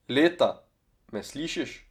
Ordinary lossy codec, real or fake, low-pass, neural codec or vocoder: none; real; 19.8 kHz; none